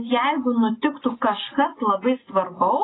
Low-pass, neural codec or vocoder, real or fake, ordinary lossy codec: 7.2 kHz; none; real; AAC, 16 kbps